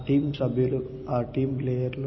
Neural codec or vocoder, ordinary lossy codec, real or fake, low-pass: none; MP3, 24 kbps; real; 7.2 kHz